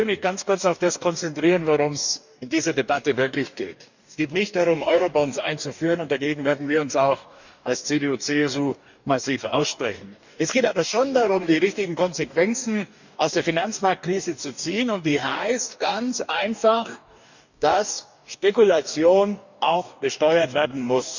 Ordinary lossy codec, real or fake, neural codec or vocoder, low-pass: none; fake; codec, 44.1 kHz, 2.6 kbps, DAC; 7.2 kHz